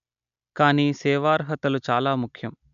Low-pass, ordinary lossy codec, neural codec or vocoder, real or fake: 7.2 kHz; none; none; real